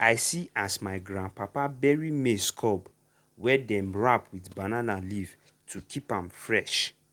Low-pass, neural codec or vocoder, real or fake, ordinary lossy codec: 19.8 kHz; none; real; Opus, 24 kbps